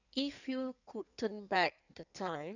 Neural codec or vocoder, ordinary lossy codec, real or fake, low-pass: codec, 16 kHz in and 24 kHz out, 1.1 kbps, FireRedTTS-2 codec; none; fake; 7.2 kHz